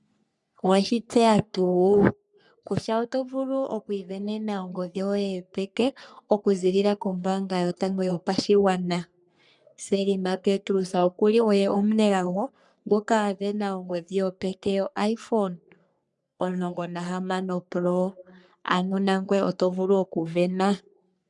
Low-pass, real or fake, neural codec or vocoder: 10.8 kHz; fake; codec, 44.1 kHz, 3.4 kbps, Pupu-Codec